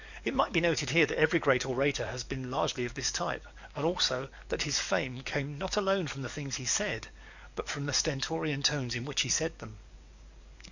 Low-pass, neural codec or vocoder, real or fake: 7.2 kHz; codec, 44.1 kHz, 7.8 kbps, DAC; fake